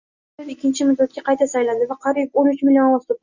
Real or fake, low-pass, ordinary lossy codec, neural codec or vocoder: real; 7.2 kHz; Opus, 64 kbps; none